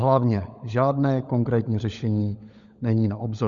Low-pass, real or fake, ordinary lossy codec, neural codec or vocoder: 7.2 kHz; fake; Opus, 64 kbps; codec, 16 kHz, 16 kbps, FunCodec, trained on LibriTTS, 50 frames a second